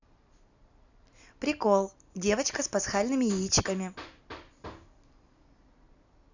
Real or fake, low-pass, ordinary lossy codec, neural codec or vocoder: fake; 7.2 kHz; none; vocoder, 44.1 kHz, 128 mel bands every 256 samples, BigVGAN v2